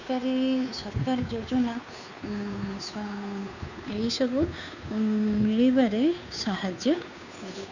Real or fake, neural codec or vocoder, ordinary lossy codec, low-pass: fake; codec, 16 kHz, 2 kbps, FunCodec, trained on Chinese and English, 25 frames a second; none; 7.2 kHz